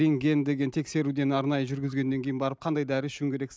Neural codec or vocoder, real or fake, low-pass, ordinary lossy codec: none; real; none; none